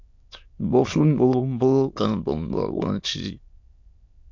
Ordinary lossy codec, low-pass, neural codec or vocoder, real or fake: MP3, 48 kbps; 7.2 kHz; autoencoder, 22.05 kHz, a latent of 192 numbers a frame, VITS, trained on many speakers; fake